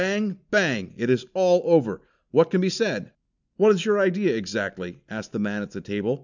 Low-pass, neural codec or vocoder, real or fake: 7.2 kHz; none; real